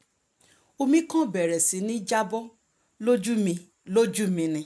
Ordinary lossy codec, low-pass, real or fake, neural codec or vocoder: none; none; real; none